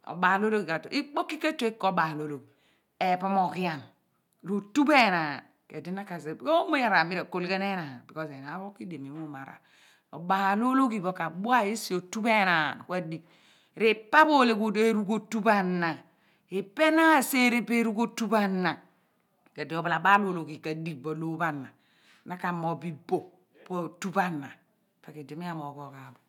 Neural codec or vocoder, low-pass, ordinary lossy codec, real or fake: none; 19.8 kHz; none; real